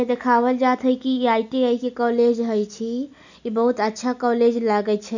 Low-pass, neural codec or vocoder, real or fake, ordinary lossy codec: 7.2 kHz; none; real; none